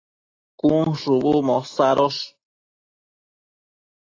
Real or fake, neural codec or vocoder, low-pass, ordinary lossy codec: real; none; 7.2 kHz; AAC, 32 kbps